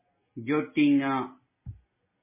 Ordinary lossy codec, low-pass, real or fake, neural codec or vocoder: MP3, 16 kbps; 3.6 kHz; real; none